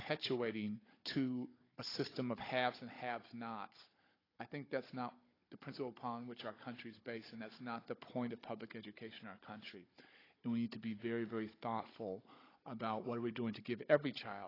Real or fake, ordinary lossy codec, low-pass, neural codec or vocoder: fake; AAC, 24 kbps; 5.4 kHz; codec, 16 kHz, 16 kbps, FunCodec, trained on Chinese and English, 50 frames a second